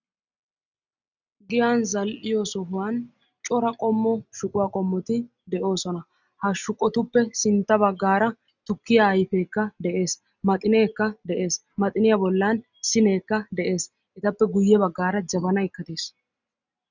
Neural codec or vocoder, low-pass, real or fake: none; 7.2 kHz; real